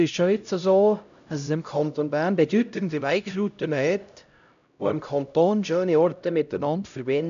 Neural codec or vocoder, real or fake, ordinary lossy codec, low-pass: codec, 16 kHz, 0.5 kbps, X-Codec, HuBERT features, trained on LibriSpeech; fake; none; 7.2 kHz